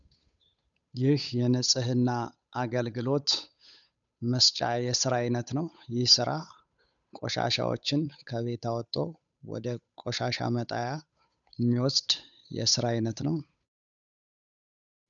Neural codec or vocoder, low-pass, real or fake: codec, 16 kHz, 8 kbps, FunCodec, trained on Chinese and English, 25 frames a second; 7.2 kHz; fake